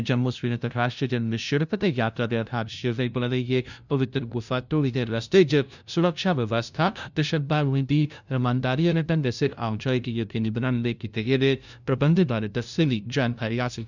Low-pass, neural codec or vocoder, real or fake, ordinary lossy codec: 7.2 kHz; codec, 16 kHz, 0.5 kbps, FunCodec, trained on LibriTTS, 25 frames a second; fake; none